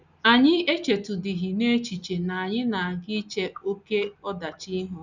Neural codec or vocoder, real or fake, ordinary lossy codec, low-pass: none; real; none; 7.2 kHz